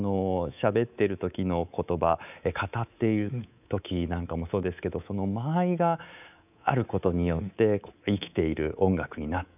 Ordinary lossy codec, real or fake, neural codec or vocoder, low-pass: none; fake; codec, 24 kHz, 3.1 kbps, DualCodec; 3.6 kHz